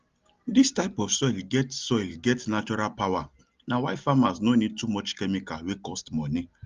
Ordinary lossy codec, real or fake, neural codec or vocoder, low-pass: Opus, 32 kbps; real; none; 7.2 kHz